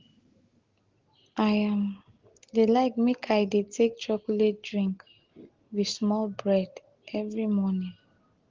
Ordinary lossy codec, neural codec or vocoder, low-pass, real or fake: Opus, 16 kbps; none; 7.2 kHz; real